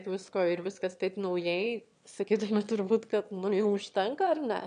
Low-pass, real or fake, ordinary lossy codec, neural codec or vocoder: 9.9 kHz; fake; MP3, 96 kbps; autoencoder, 22.05 kHz, a latent of 192 numbers a frame, VITS, trained on one speaker